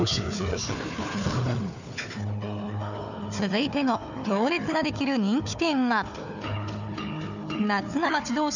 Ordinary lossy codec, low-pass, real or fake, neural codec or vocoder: none; 7.2 kHz; fake; codec, 16 kHz, 4 kbps, FunCodec, trained on Chinese and English, 50 frames a second